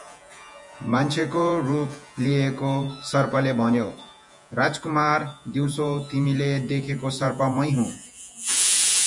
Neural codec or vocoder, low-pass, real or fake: vocoder, 48 kHz, 128 mel bands, Vocos; 10.8 kHz; fake